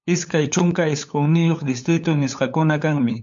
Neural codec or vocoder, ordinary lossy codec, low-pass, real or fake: codec, 16 kHz, 8 kbps, FunCodec, trained on LibriTTS, 25 frames a second; MP3, 64 kbps; 7.2 kHz; fake